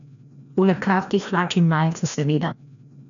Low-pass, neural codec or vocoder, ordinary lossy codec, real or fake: 7.2 kHz; codec, 16 kHz, 1 kbps, FreqCodec, larger model; none; fake